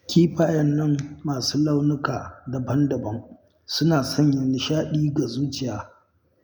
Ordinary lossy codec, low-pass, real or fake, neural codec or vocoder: none; none; real; none